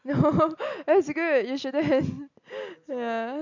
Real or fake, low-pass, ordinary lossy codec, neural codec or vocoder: real; 7.2 kHz; MP3, 64 kbps; none